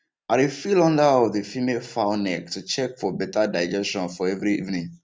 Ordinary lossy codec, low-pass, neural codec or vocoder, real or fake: none; none; none; real